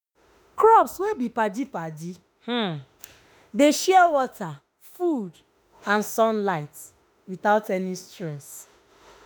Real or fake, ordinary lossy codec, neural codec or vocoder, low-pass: fake; none; autoencoder, 48 kHz, 32 numbers a frame, DAC-VAE, trained on Japanese speech; none